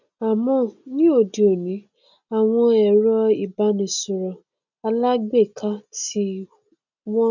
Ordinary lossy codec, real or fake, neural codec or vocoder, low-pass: none; real; none; 7.2 kHz